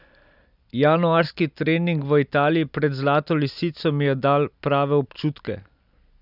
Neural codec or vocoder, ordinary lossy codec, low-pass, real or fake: none; none; 5.4 kHz; real